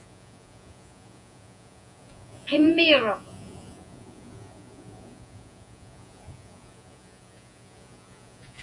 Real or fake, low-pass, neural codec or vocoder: fake; 10.8 kHz; vocoder, 48 kHz, 128 mel bands, Vocos